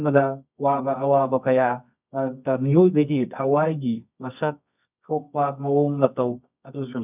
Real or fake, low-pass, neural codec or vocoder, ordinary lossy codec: fake; 3.6 kHz; codec, 24 kHz, 0.9 kbps, WavTokenizer, medium music audio release; none